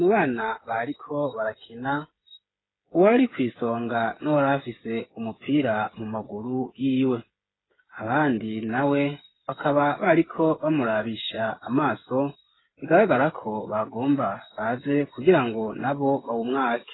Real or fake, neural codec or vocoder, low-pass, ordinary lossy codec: fake; codec, 16 kHz, 8 kbps, FreqCodec, smaller model; 7.2 kHz; AAC, 16 kbps